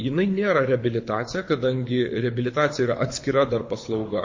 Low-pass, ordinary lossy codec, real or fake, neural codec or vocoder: 7.2 kHz; MP3, 32 kbps; fake; codec, 24 kHz, 6 kbps, HILCodec